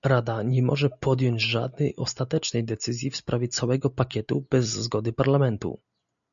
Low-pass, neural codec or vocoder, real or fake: 7.2 kHz; none; real